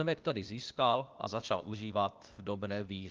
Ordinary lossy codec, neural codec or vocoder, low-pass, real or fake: Opus, 32 kbps; codec, 16 kHz, 0.8 kbps, ZipCodec; 7.2 kHz; fake